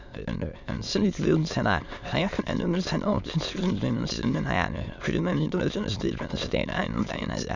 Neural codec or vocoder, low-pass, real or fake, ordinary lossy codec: autoencoder, 22.05 kHz, a latent of 192 numbers a frame, VITS, trained on many speakers; 7.2 kHz; fake; none